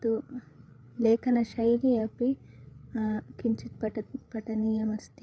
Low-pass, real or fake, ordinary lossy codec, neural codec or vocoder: none; fake; none; codec, 16 kHz, 8 kbps, FreqCodec, larger model